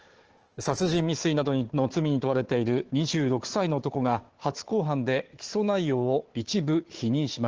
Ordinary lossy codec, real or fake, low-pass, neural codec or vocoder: Opus, 16 kbps; fake; 7.2 kHz; codec, 16 kHz, 4 kbps, FunCodec, trained on Chinese and English, 50 frames a second